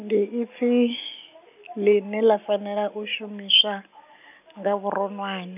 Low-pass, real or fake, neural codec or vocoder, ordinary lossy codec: 3.6 kHz; real; none; none